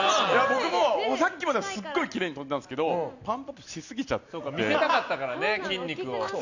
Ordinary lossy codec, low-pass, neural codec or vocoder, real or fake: none; 7.2 kHz; none; real